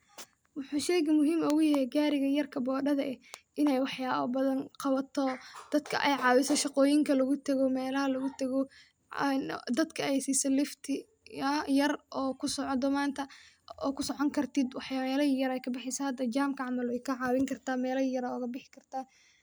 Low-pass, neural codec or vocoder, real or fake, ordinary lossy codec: none; none; real; none